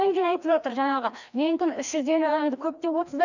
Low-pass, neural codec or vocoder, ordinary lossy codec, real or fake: 7.2 kHz; codec, 16 kHz, 1 kbps, FreqCodec, larger model; none; fake